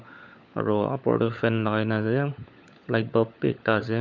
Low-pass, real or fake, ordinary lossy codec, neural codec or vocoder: 7.2 kHz; fake; none; codec, 16 kHz, 16 kbps, FunCodec, trained on LibriTTS, 50 frames a second